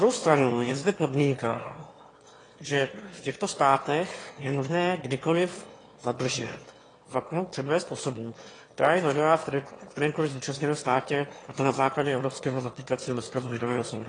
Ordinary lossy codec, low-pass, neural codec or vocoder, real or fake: AAC, 32 kbps; 9.9 kHz; autoencoder, 22.05 kHz, a latent of 192 numbers a frame, VITS, trained on one speaker; fake